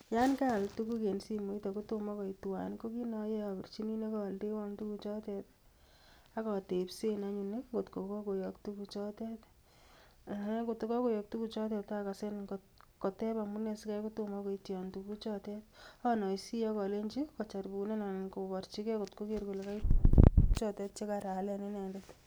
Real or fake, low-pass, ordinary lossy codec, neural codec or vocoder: real; none; none; none